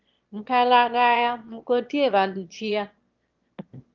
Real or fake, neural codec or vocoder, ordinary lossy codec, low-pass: fake; autoencoder, 22.05 kHz, a latent of 192 numbers a frame, VITS, trained on one speaker; Opus, 24 kbps; 7.2 kHz